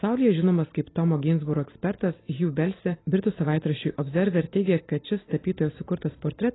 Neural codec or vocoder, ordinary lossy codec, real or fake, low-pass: none; AAC, 16 kbps; real; 7.2 kHz